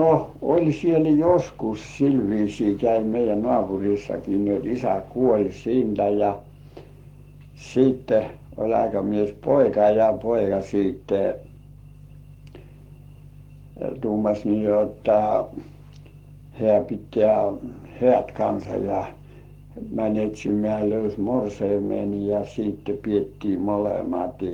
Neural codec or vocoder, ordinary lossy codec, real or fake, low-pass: codec, 44.1 kHz, 7.8 kbps, Pupu-Codec; Opus, 24 kbps; fake; 19.8 kHz